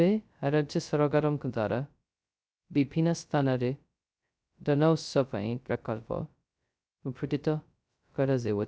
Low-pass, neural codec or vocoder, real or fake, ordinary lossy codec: none; codec, 16 kHz, 0.2 kbps, FocalCodec; fake; none